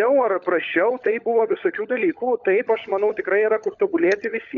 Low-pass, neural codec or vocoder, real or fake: 7.2 kHz; codec, 16 kHz, 16 kbps, FunCodec, trained on LibriTTS, 50 frames a second; fake